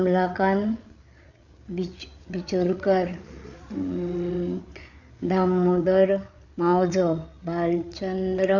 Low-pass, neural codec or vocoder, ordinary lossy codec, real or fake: 7.2 kHz; codec, 16 kHz, 8 kbps, FreqCodec, larger model; none; fake